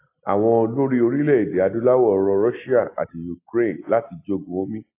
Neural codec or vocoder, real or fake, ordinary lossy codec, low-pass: none; real; AAC, 24 kbps; 3.6 kHz